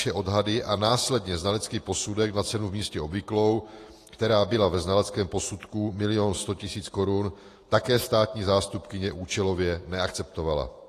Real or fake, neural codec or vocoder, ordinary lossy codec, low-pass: fake; vocoder, 48 kHz, 128 mel bands, Vocos; AAC, 48 kbps; 14.4 kHz